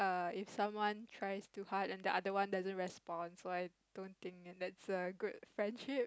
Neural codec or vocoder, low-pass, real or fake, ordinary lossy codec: none; none; real; none